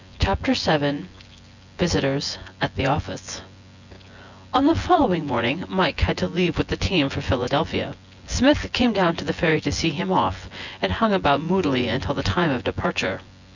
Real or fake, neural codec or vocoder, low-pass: fake; vocoder, 24 kHz, 100 mel bands, Vocos; 7.2 kHz